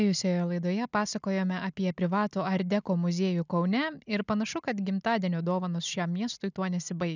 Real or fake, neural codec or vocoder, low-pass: real; none; 7.2 kHz